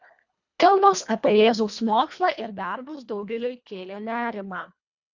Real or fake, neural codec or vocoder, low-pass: fake; codec, 24 kHz, 1.5 kbps, HILCodec; 7.2 kHz